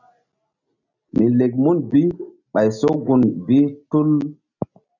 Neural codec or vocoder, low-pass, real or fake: none; 7.2 kHz; real